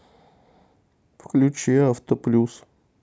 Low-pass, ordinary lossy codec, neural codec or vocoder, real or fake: none; none; none; real